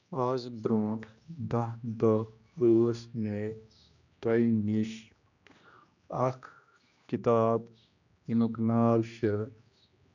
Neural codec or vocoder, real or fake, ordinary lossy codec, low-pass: codec, 16 kHz, 1 kbps, X-Codec, HuBERT features, trained on general audio; fake; none; 7.2 kHz